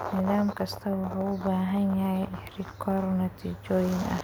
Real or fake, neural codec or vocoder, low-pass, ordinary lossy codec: real; none; none; none